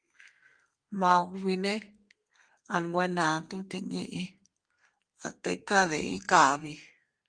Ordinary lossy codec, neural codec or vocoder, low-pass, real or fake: Opus, 32 kbps; codec, 32 kHz, 1.9 kbps, SNAC; 9.9 kHz; fake